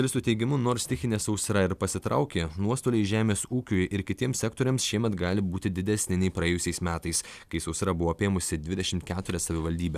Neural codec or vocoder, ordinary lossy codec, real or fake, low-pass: none; Opus, 64 kbps; real; 14.4 kHz